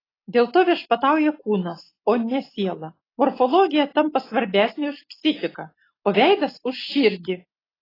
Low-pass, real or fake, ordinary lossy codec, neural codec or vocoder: 5.4 kHz; real; AAC, 24 kbps; none